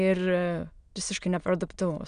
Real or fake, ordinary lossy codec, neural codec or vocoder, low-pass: fake; Opus, 64 kbps; autoencoder, 22.05 kHz, a latent of 192 numbers a frame, VITS, trained on many speakers; 9.9 kHz